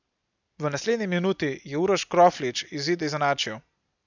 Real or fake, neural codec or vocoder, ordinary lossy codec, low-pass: real; none; none; 7.2 kHz